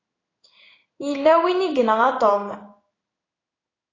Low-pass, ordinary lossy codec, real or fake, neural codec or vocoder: 7.2 kHz; AAC, 32 kbps; fake; codec, 16 kHz in and 24 kHz out, 1 kbps, XY-Tokenizer